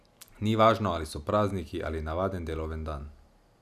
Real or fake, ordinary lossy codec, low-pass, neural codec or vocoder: real; none; 14.4 kHz; none